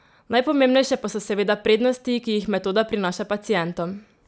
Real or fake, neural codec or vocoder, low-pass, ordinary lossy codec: real; none; none; none